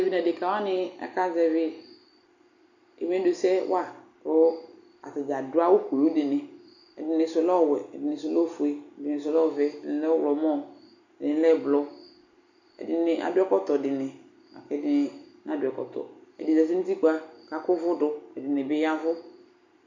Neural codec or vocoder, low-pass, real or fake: none; 7.2 kHz; real